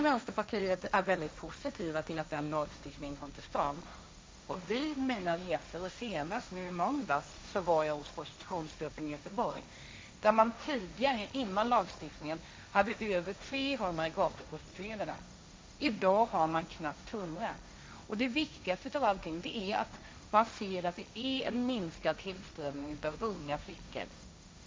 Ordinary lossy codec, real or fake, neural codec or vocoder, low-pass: none; fake; codec, 16 kHz, 1.1 kbps, Voila-Tokenizer; none